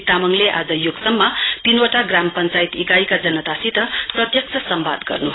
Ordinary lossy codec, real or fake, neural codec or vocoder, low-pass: AAC, 16 kbps; real; none; 7.2 kHz